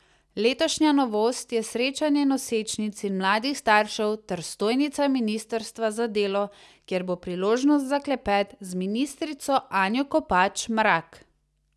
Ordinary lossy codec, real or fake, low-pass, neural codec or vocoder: none; real; none; none